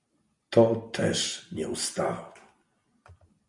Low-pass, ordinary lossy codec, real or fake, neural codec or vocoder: 10.8 kHz; MP3, 64 kbps; real; none